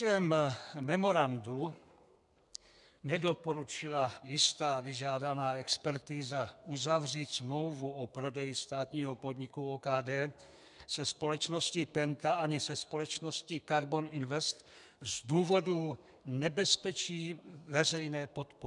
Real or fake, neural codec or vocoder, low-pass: fake; codec, 32 kHz, 1.9 kbps, SNAC; 10.8 kHz